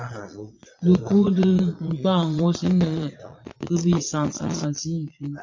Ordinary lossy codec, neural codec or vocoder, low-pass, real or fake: MP3, 48 kbps; vocoder, 22.05 kHz, 80 mel bands, Vocos; 7.2 kHz; fake